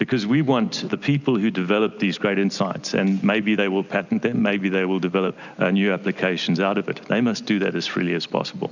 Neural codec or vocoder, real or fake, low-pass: none; real; 7.2 kHz